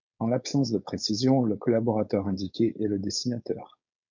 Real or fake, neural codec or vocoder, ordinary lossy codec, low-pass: fake; codec, 16 kHz, 4.8 kbps, FACodec; MP3, 64 kbps; 7.2 kHz